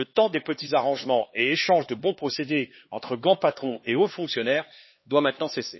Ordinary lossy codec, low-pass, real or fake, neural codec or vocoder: MP3, 24 kbps; 7.2 kHz; fake; codec, 16 kHz, 2 kbps, X-Codec, HuBERT features, trained on LibriSpeech